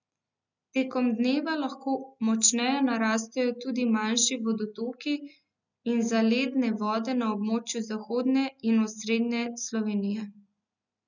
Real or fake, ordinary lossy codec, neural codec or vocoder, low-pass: real; none; none; 7.2 kHz